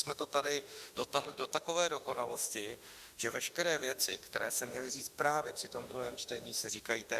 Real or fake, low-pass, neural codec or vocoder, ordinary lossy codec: fake; 14.4 kHz; autoencoder, 48 kHz, 32 numbers a frame, DAC-VAE, trained on Japanese speech; Opus, 64 kbps